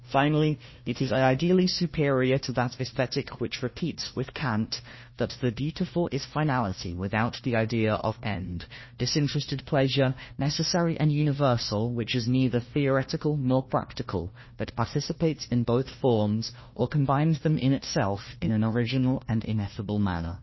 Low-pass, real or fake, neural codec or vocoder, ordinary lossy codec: 7.2 kHz; fake; codec, 16 kHz, 1 kbps, FunCodec, trained on Chinese and English, 50 frames a second; MP3, 24 kbps